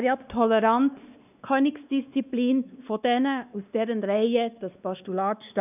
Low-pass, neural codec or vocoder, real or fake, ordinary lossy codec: 3.6 kHz; codec, 16 kHz, 2 kbps, X-Codec, WavLM features, trained on Multilingual LibriSpeech; fake; none